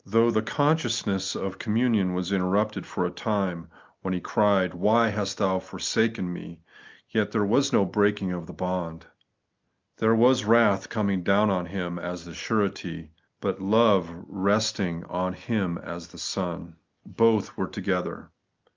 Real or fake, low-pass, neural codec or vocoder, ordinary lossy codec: real; 7.2 kHz; none; Opus, 24 kbps